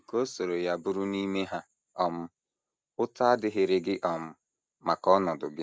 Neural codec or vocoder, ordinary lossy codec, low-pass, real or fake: none; none; none; real